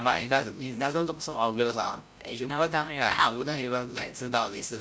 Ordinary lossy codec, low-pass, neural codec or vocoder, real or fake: none; none; codec, 16 kHz, 0.5 kbps, FreqCodec, larger model; fake